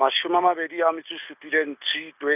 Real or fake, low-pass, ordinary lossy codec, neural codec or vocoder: real; 3.6 kHz; none; none